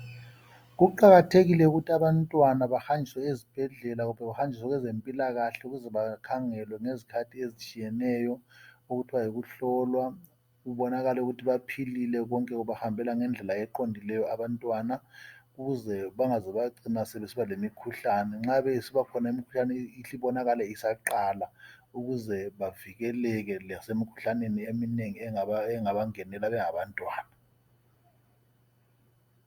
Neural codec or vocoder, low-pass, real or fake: none; 19.8 kHz; real